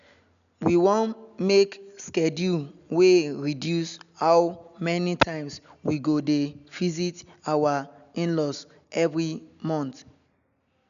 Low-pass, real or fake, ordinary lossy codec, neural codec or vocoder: 7.2 kHz; real; none; none